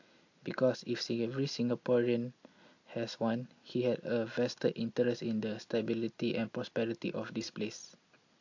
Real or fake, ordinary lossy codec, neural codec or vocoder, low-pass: real; AAC, 48 kbps; none; 7.2 kHz